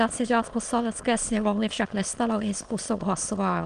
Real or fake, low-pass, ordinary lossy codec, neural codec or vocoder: fake; 9.9 kHz; Opus, 24 kbps; autoencoder, 22.05 kHz, a latent of 192 numbers a frame, VITS, trained on many speakers